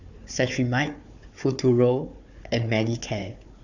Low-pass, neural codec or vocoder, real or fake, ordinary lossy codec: 7.2 kHz; codec, 16 kHz, 4 kbps, FunCodec, trained on Chinese and English, 50 frames a second; fake; none